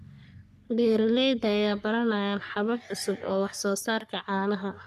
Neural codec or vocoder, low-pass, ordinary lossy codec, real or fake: codec, 44.1 kHz, 3.4 kbps, Pupu-Codec; 14.4 kHz; none; fake